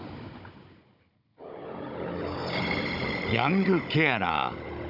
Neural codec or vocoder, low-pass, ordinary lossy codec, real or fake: codec, 16 kHz, 16 kbps, FunCodec, trained on Chinese and English, 50 frames a second; 5.4 kHz; none; fake